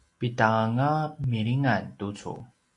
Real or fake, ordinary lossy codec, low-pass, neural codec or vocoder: real; AAC, 48 kbps; 10.8 kHz; none